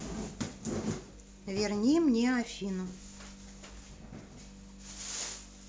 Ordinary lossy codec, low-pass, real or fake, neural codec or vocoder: none; none; real; none